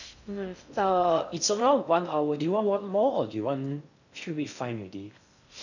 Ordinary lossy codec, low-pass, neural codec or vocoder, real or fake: none; 7.2 kHz; codec, 16 kHz in and 24 kHz out, 0.6 kbps, FocalCodec, streaming, 2048 codes; fake